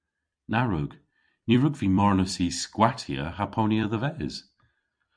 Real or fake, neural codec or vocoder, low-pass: fake; vocoder, 44.1 kHz, 128 mel bands every 256 samples, BigVGAN v2; 9.9 kHz